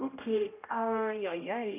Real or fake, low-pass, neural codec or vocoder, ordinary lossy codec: fake; 3.6 kHz; codec, 16 kHz, 0.5 kbps, X-Codec, HuBERT features, trained on general audio; none